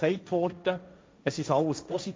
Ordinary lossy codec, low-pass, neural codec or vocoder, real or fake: none; none; codec, 16 kHz, 1.1 kbps, Voila-Tokenizer; fake